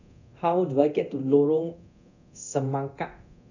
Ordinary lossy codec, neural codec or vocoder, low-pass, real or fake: none; codec, 24 kHz, 0.9 kbps, DualCodec; 7.2 kHz; fake